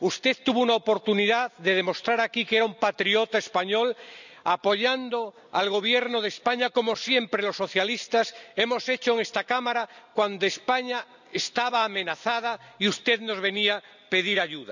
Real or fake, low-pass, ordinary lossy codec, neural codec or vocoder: real; 7.2 kHz; none; none